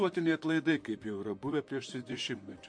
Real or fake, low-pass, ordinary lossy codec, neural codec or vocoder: fake; 9.9 kHz; MP3, 48 kbps; vocoder, 44.1 kHz, 128 mel bands, Pupu-Vocoder